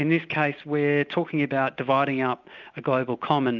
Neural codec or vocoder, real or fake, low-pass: none; real; 7.2 kHz